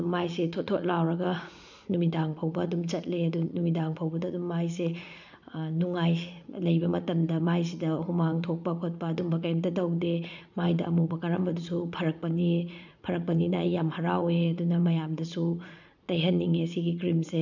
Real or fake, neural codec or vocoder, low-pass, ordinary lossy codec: fake; vocoder, 44.1 kHz, 80 mel bands, Vocos; 7.2 kHz; none